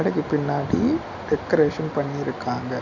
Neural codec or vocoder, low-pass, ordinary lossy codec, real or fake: none; 7.2 kHz; none; real